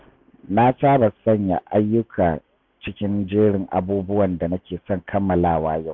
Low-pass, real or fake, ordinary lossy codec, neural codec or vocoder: 7.2 kHz; real; MP3, 64 kbps; none